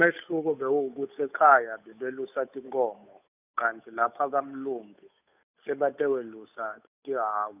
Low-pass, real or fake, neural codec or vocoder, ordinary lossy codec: 3.6 kHz; fake; codec, 16 kHz, 8 kbps, FunCodec, trained on Chinese and English, 25 frames a second; none